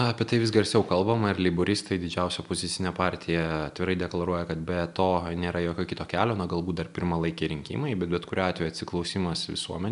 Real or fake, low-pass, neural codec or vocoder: real; 10.8 kHz; none